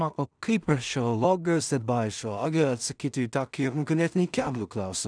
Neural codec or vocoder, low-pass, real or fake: codec, 16 kHz in and 24 kHz out, 0.4 kbps, LongCat-Audio-Codec, two codebook decoder; 9.9 kHz; fake